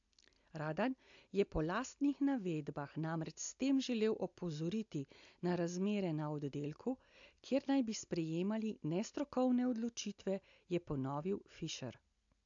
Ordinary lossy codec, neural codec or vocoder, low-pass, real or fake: AAC, 64 kbps; none; 7.2 kHz; real